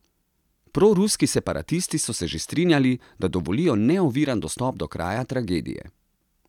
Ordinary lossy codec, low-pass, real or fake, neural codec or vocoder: none; 19.8 kHz; real; none